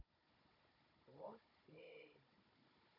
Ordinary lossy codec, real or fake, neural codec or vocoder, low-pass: none; fake; codec, 16 kHz, 16 kbps, FunCodec, trained on Chinese and English, 50 frames a second; 5.4 kHz